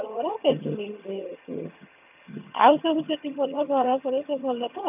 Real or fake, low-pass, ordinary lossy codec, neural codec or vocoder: fake; 3.6 kHz; none; vocoder, 22.05 kHz, 80 mel bands, HiFi-GAN